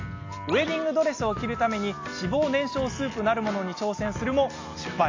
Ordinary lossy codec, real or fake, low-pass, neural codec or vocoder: AAC, 32 kbps; real; 7.2 kHz; none